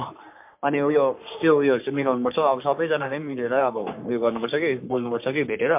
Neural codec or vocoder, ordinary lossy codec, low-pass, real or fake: codec, 44.1 kHz, 3.4 kbps, Pupu-Codec; MP3, 32 kbps; 3.6 kHz; fake